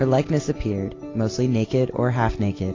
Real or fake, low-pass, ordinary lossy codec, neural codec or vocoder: real; 7.2 kHz; AAC, 32 kbps; none